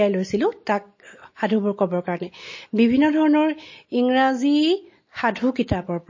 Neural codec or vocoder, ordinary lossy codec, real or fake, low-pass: none; MP3, 32 kbps; real; 7.2 kHz